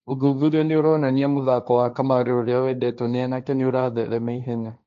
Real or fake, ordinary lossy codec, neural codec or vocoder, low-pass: fake; none; codec, 16 kHz, 1.1 kbps, Voila-Tokenizer; 7.2 kHz